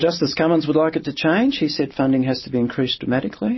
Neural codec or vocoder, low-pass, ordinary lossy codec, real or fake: none; 7.2 kHz; MP3, 24 kbps; real